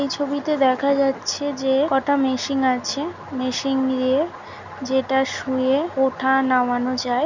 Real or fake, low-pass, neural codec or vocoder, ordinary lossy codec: real; 7.2 kHz; none; none